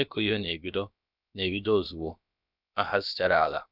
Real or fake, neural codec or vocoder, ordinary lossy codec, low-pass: fake; codec, 16 kHz, about 1 kbps, DyCAST, with the encoder's durations; none; 5.4 kHz